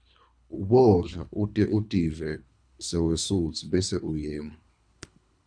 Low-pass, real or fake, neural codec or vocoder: 9.9 kHz; fake; codec, 24 kHz, 3 kbps, HILCodec